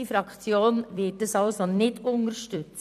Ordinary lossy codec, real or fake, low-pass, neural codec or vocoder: none; fake; 14.4 kHz; vocoder, 44.1 kHz, 128 mel bands every 256 samples, BigVGAN v2